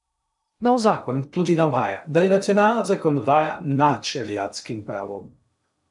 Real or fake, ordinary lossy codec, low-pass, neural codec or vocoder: fake; none; 10.8 kHz; codec, 16 kHz in and 24 kHz out, 0.6 kbps, FocalCodec, streaming, 2048 codes